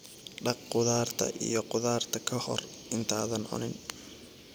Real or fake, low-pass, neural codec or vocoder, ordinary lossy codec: real; none; none; none